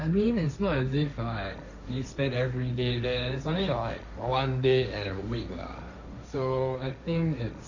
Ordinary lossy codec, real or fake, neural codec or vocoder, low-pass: none; fake; codec, 16 kHz, 1.1 kbps, Voila-Tokenizer; 7.2 kHz